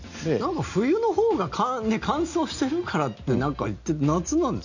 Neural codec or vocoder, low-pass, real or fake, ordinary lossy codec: none; 7.2 kHz; real; none